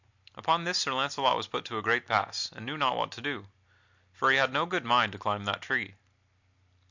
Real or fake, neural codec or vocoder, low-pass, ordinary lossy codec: real; none; 7.2 kHz; MP3, 64 kbps